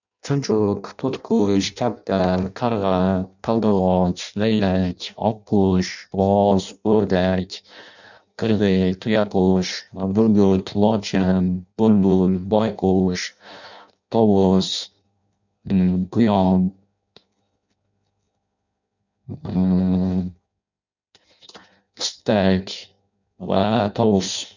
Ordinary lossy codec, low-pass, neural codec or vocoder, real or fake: none; 7.2 kHz; codec, 16 kHz in and 24 kHz out, 0.6 kbps, FireRedTTS-2 codec; fake